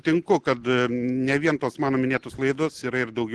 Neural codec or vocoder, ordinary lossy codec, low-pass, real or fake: none; Opus, 16 kbps; 10.8 kHz; real